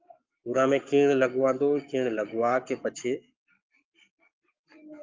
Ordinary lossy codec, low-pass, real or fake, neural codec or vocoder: Opus, 24 kbps; 7.2 kHz; fake; codec, 44.1 kHz, 7.8 kbps, Pupu-Codec